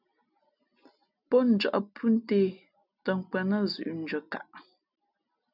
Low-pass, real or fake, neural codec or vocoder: 5.4 kHz; real; none